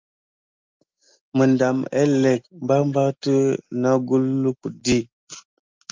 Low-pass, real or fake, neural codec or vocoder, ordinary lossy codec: 7.2 kHz; real; none; Opus, 32 kbps